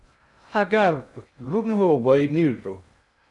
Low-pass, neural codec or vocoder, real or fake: 10.8 kHz; codec, 16 kHz in and 24 kHz out, 0.6 kbps, FocalCodec, streaming, 2048 codes; fake